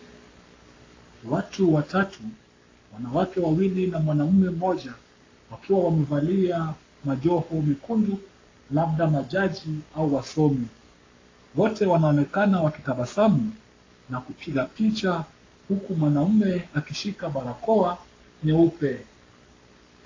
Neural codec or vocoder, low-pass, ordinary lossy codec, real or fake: codec, 44.1 kHz, 7.8 kbps, Pupu-Codec; 7.2 kHz; AAC, 32 kbps; fake